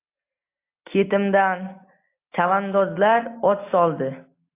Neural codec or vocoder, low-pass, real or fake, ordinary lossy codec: none; 3.6 kHz; real; AAC, 24 kbps